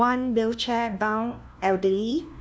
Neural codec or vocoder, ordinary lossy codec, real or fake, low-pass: codec, 16 kHz, 1 kbps, FunCodec, trained on LibriTTS, 50 frames a second; none; fake; none